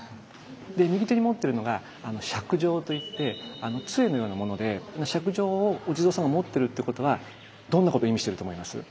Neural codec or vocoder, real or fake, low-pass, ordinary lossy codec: none; real; none; none